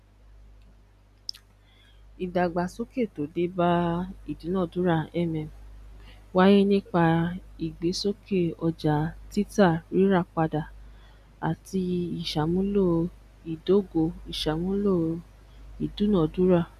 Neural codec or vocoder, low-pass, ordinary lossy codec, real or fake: none; 14.4 kHz; none; real